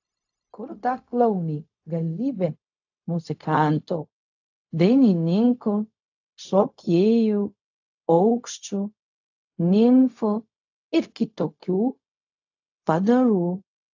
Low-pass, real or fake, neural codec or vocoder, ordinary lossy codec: 7.2 kHz; fake; codec, 16 kHz, 0.4 kbps, LongCat-Audio-Codec; AAC, 48 kbps